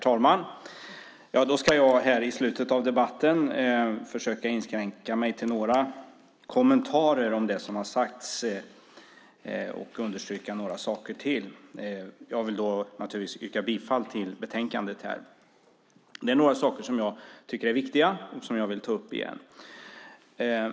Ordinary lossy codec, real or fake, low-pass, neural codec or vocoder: none; real; none; none